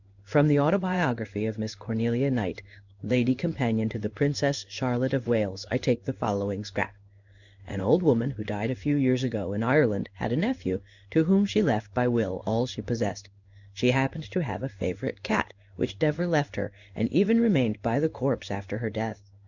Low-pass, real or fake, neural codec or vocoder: 7.2 kHz; fake; codec, 16 kHz in and 24 kHz out, 1 kbps, XY-Tokenizer